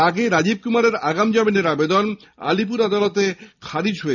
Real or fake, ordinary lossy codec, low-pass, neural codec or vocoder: real; none; 7.2 kHz; none